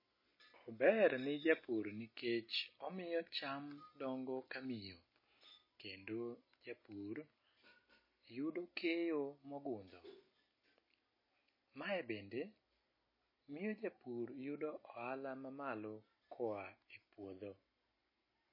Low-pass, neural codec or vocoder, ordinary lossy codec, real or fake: 5.4 kHz; none; MP3, 24 kbps; real